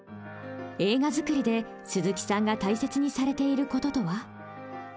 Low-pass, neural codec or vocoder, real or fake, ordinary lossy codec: none; none; real; none